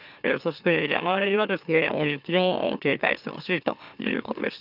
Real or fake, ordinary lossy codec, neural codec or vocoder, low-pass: fake; none; autoencoder, 44.1 kHz, a latent of 192 numbers a frame, MeloTTS; 5.4 kHz